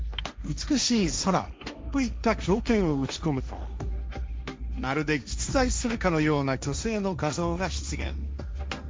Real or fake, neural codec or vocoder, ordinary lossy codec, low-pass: fake; codec, 16 kHz, 1.1 kbps, Voila-Tokenizer; none; none